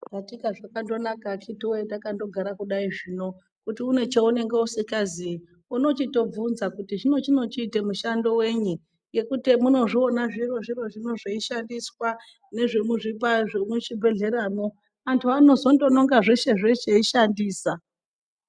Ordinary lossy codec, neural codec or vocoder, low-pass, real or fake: MP3, 96 kbps; none; 9.9 kHz; real